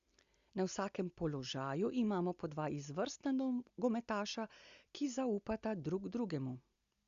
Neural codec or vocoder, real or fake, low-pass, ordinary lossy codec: none; real; 7.2 kHz; Opus, 64 kbps